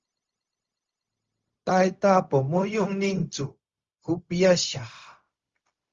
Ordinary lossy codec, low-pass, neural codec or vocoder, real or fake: Opus, 32 kbps; 7.2 kHz; codec, 16 kHz, 0.4 kbps, LongCat-Audio-Codec; fake